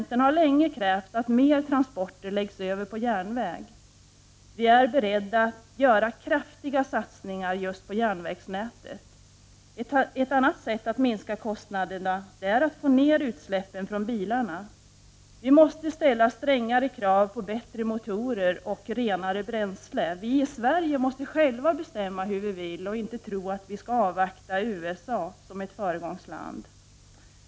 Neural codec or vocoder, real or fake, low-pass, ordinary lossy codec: none; real; none; none